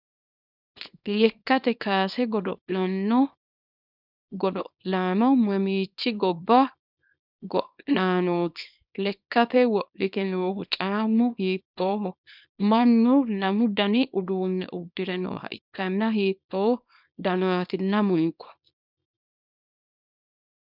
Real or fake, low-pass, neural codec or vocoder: fake; 5.4 kHz; codec, 24 kHz, 0.9 kbps, WavTokenizer, small release